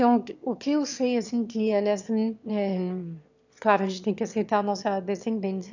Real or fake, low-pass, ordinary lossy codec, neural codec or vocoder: fake; 7.2 kHz; none; autoencoder, 22.05 kHz, a latent of 192 numbers a frame, VITS, trained on one speaker